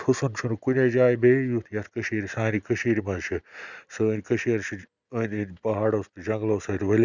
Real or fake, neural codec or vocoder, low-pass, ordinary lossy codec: real; none; 7.2 kHz; none